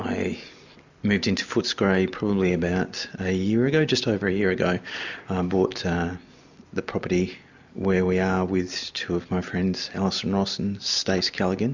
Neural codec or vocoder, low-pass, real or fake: none; 7.2 kHz; real